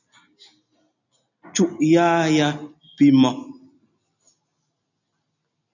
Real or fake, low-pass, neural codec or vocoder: real; 7.2 kHz; none